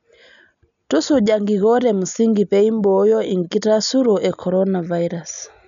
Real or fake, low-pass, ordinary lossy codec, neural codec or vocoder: real; 7.2 kHz; none; none